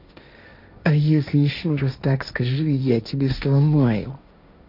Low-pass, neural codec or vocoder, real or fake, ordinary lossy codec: 5.4 kHz; codec, 16 kHz, 1.1 kbps, Voila-Tokenizer; fake; none